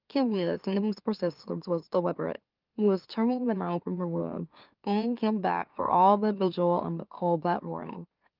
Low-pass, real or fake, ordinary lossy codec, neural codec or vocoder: 5.4 kHz; fake; Opus, 24 kbps; autoencoder, 44.1 kHz, a latent of 192 numbers a frame, MeloTTS